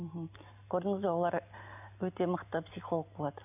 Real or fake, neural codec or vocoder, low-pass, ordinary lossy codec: real; none; 3.6 kHz; none